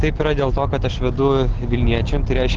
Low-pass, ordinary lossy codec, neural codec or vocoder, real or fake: 7.2 kHz; Opus, 16 kbps; none; real